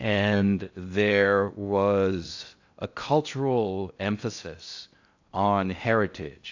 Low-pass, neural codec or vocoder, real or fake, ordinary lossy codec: 7.2 kHz; codec, 16 kHz in and 24 kHz out, 0.6 kbps, FocalCodec, streaming, 4096 codes; fake; AAC, 48 kbps